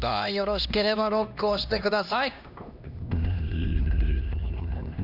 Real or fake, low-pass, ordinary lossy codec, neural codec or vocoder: fake; 5.4 kHz; none; codec, 16 kHz, 1 kbps, X-Codec, HuBERT features, trained on LibriSpeech